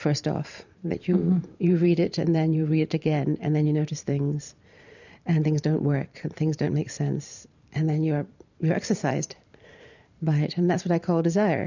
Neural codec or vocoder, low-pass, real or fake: vocoder, 44.1 kHz, 128 mel bands every 512 samples, BigVGAN v2; 7.2 kHz; fake